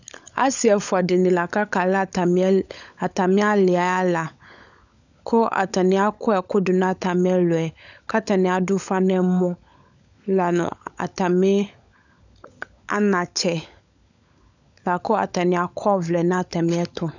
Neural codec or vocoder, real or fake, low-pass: codec, 16 kHz, 16 kbps, FunCodec, trained on LibriTTS, 50 frames a second; fake; 7.2 kHz